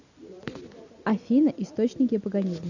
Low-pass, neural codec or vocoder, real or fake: 7.2 kHz; none; real